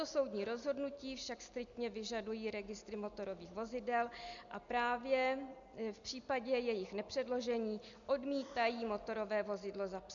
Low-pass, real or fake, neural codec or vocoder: 7.2 kHz; real; none